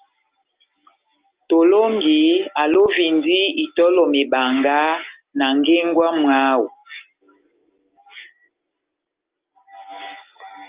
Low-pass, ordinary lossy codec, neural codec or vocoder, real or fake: 3.6 kHz; Opus, 32 kbps; none; real